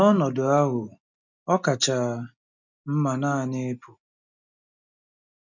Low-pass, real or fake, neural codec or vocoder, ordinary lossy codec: 7.2 kHz; real; none; none